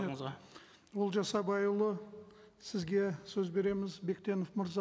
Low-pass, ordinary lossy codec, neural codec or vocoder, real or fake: none; none; none; real